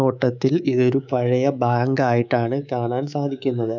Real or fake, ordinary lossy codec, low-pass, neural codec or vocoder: fake; none; 7.2 kHz; codec, 24 kHz, 3.1 kbps, DualCodec